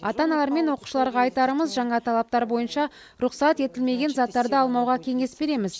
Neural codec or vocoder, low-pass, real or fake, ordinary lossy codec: none; none; real; none